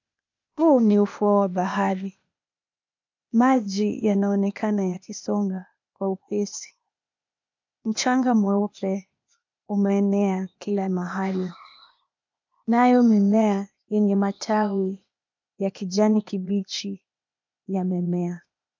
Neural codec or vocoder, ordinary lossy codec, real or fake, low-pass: codec, 16 kHz, 0.8 kbps, ZipCodec; MP3, 64 kbps; fake; 7.2 kHz